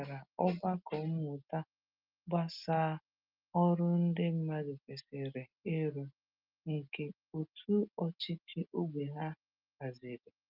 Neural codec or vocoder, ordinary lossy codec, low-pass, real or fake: none; Opus, 24 kbps; 5.4 kHz; real